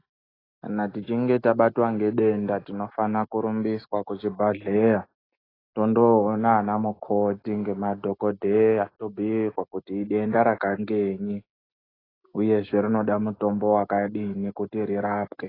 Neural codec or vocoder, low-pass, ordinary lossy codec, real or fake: none; 5.4 kHz; AAC, 24 kbps; real